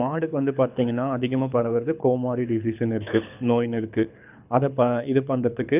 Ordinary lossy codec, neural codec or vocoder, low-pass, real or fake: none; codec, 24 kHz, 6 kbps, HILCodec; 3.6 kHz; fake